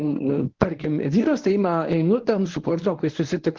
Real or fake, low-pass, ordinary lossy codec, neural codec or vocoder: fake; 7.2 kHz; Opus, 16 kbps; codec, 24 kHz, 0.9 kbps, WavTokenizer, medium speech release version 1